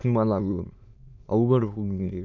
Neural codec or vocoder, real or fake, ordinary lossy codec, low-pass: autoencoder, 22.05 kHz, a latent of 192 numbers a frame, VITS, trained on many speakers; fake; none; 7.2 kHz